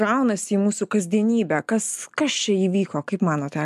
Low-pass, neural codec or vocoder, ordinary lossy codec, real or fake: 14.4 kHz; none; MP3, 96 kbps; real